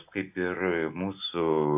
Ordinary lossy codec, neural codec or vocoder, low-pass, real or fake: AAC, 32 kbps; none; 3.6 kHz; real